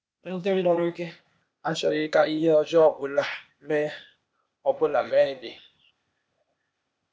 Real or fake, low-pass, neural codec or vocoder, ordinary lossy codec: fake; none; codec, 16 kHz, 0.8 kbps, ZipCodec; none